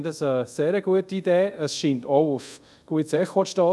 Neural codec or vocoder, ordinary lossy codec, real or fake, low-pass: codec, 24 kHz, 0.5 kbps, DualCodec; none; fake; none